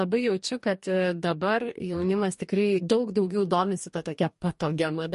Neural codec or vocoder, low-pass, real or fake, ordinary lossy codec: codec, 44.1 kHz, 2.6 kbps, DAC; 14.4 kHz; fake; MP3, 48 kbps